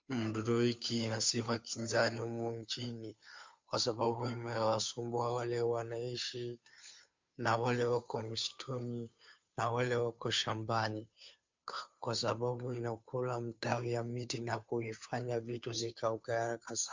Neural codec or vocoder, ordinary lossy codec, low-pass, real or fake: codec, 16 kHz, 2 kbps, FunCodec, trained on Chinese and English, 25 frames a second; MP3, 64 kbps; 7.2 kHz; fake